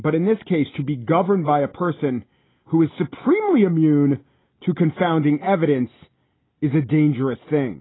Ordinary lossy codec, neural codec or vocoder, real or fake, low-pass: AAC, 16 kbps; none; real; 7.2 kHz